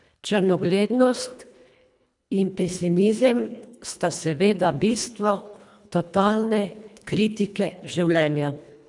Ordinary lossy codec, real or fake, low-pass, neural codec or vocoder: none; fake; 10.8 kHz; codec, 24 kHz, 1.5 kbps, HILCodec